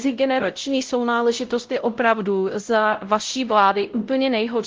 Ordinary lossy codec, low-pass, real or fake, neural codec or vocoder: Opus, 16 kbps; 7.2 kHz; fake; codec, 16 kHz, 0.5 kbps, X-Codec, WavLM features, trained on Multilingual LibriSpeech